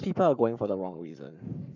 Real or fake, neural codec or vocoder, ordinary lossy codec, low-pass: fake; codec, 44.1 kHz, 7.8 kbps, Pupu-Codec; none; 7.2 kHz